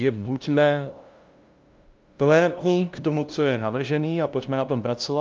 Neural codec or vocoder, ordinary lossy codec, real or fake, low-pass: codec, 16 kHz, 0.5 kbps, FunCodec, trained on LibriTTS, 25 frames a second; Opus, 24 kbps; fake; 7.2 kHz